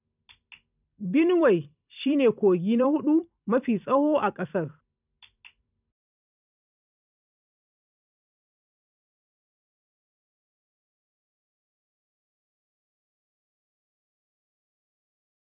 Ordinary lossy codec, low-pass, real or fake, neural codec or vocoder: none; 3.6 kHz; fake; vocoder, 44.1 kHz, 128 mel bands every 256 samples, BigVGAN v2